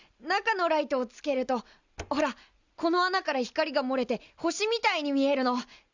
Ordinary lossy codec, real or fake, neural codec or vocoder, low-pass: Opus, 64 kbps; real; none; 7.2 kHz